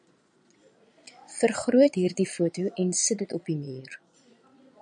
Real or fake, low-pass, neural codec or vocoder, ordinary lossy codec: fake; 9.9 kHz; vocoder, 22.05 kHz, 80 mel bands, Vocos; MP3, 96 kbps